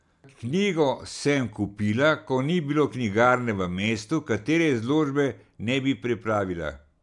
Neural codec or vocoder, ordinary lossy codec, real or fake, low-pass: none; none; real; 10.8 kHz